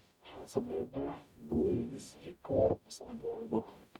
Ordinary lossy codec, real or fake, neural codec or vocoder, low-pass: none; fake; codec, 44.1 kHz, 0.9 kbps, DAC; 19.8 kHz